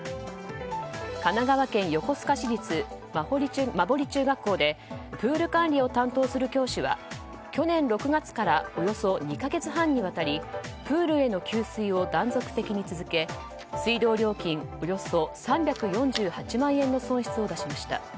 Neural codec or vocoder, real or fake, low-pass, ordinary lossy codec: none; real; none; none